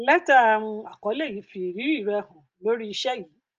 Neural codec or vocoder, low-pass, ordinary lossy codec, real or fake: none; 7.2 kHz; Opus, 24 kbps; real